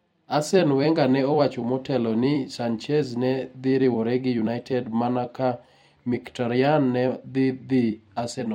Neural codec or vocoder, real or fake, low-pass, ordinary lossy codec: vocoder, 44.1 kHz, 128 mel bands every 256 samples, BigVGAN v2; fake; 19.8 kHz; MP3, 64 kbps